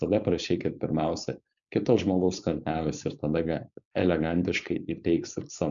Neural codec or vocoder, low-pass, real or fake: codec, 16 kHz, 4.8 kbps, FACodec; 7.2 kHz; fake